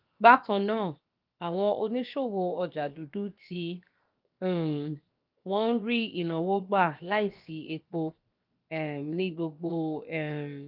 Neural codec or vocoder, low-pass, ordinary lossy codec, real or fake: codec, 16 kHz, 0.7 kbps, FocalCodec; 5.4 kHz; Opus, 24 kbps; fake